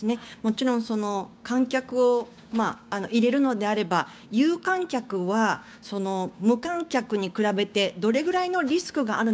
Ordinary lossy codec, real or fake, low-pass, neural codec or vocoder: none; fake; none; codec, 16 kHz, 6 kbps, DAC